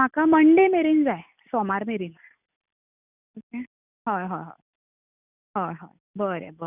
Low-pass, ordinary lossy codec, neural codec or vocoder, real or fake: 3.6 kHz; none; none; real